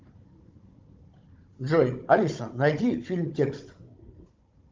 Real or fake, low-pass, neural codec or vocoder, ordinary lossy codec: fake; 7.2 kHz; codec, 16 kHz, 16 kbps, FunCodec, trained on Chinese and English, 50 frames a second; Opus, 32 kbps